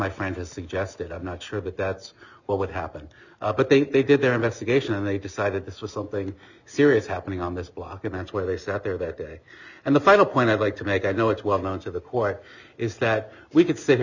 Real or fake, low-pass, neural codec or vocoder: real; 7.2 kHz; none